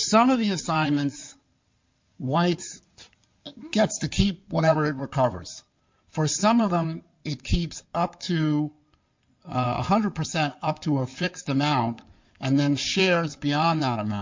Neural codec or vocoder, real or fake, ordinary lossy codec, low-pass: codec, 16 kHz in and 24 kHz out, 2.2 kbps, FireRedTTS-2 codec; fake; MP3, 48 kbps; 7.2 kHz